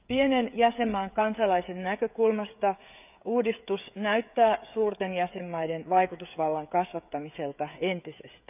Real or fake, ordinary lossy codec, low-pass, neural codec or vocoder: fake; none; 3.6 kHz; codec, 16 kHz, 16 kbps, FreqCodec, smaller model